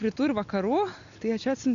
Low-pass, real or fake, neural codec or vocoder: 7.2 kHz; real; none